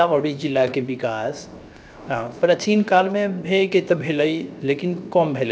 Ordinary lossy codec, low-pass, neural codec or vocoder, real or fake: none; none; codec, 16 kHz, 0.7 kbps, FocalCodec; fake